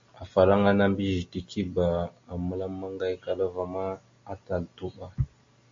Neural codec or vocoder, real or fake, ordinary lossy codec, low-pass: none; real; MP3, 48 kbps; 7.2 kHz